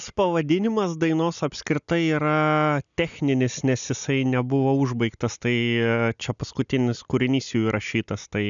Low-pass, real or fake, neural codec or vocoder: 7.2 kHz; real; none